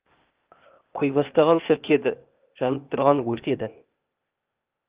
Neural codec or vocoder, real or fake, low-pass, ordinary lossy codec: codec, 16 kHz, 0.8 kbps, ZipCodec; fake; 3.6 kHz; Opus, 24 kbps